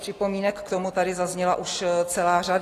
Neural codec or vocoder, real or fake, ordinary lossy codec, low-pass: none; real; AAC, 48 kbps; 14.4 kHz